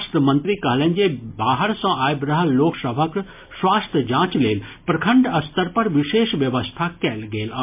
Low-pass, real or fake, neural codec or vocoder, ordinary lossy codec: 3.6 kHz; real; none; MP3, 32 kbps